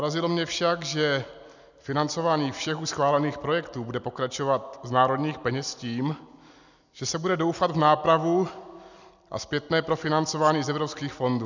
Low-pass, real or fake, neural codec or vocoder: 7.2 kHz; real; none